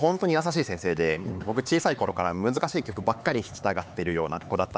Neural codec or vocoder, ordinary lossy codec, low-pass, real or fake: codec, 16 kHz, 4 kbps, X-Codec, HuBERT features, trained on LibriSpeech; none; none; fake